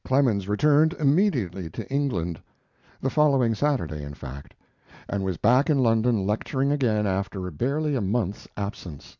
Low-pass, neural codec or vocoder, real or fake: 7.2 kHz; none; real